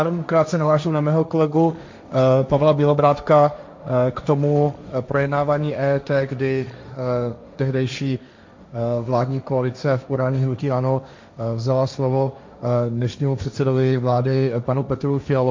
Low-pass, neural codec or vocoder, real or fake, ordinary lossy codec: 7.2 kHz; codec, 16 kHz, 1.1 kbps, Voila-Tokenizer; fake; MP3, 64 kbps